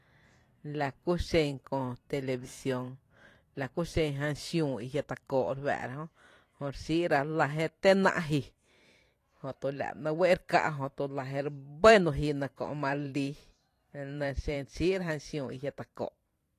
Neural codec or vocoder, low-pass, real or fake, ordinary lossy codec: vocoder, 44.1 kHz, 128 mel bands every 512 samples, BigVGAN v2; 14.4 kHz; fake; AAC, 48 kbps